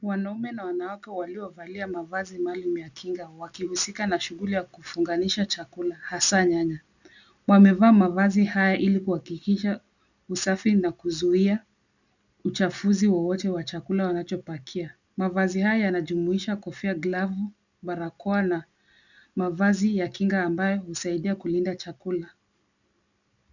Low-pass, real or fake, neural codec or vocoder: 7.2 kHz; real; none